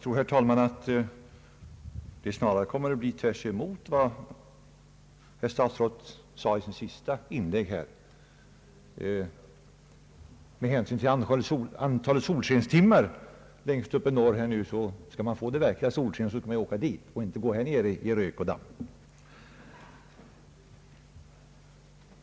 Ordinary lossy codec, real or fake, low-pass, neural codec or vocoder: none; real; none; none